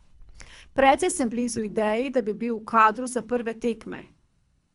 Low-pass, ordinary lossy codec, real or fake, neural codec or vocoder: 10.8 kHz; Opus, 64 kbps; fake; codec, 24 kHz, 3 kbps, HILCodec